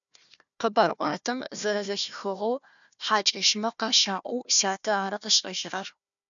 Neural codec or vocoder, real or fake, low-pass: codec, 16 kHz, 1 kbps, FunCodec, trained on Chinese and English, 50 frames a second; fake; 7.2 kHz